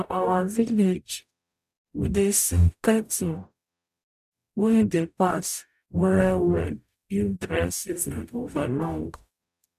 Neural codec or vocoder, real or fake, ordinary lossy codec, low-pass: codec, 44.1 kHz, 0.9 kbps, DAC; fake; none; 14.4 kHz